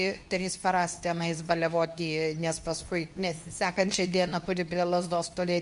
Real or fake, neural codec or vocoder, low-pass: fake; codec, 24 kHz, 0.9 kbps, WavTokenizer, medium speech release version 2; 10.8 kHz